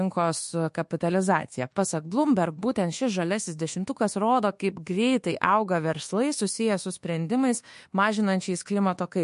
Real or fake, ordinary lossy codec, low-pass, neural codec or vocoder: fake; MP3, 48 kbps; 10.8 kHz; codec, 24 kHz, 1.2 kbps, DualCodec